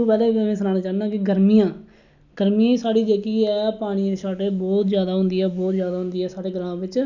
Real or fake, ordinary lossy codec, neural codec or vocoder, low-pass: real; none; none; 7.2 kHz